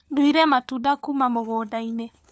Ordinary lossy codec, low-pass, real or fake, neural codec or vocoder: none; none; fake; codec, 16 kHz, 4 kbps, FunCodec, trained on LibriTTS, 50 frames a second